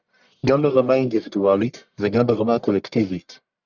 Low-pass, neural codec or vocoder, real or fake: 7.2 kHz; codec, 44.1 kHz, 1.7 kbps, Pupu-Codec; fake